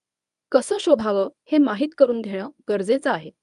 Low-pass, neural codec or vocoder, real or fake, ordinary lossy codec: 10.8 kHz; codec, 24 kHz, 0.9 kbps, WavTokenizer, medium speech release version 1; fake; none